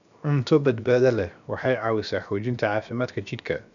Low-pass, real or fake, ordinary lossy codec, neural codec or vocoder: 7.2 kHz; fake; none; codec, 16 kHz, 0.7 kbps, FocalCodec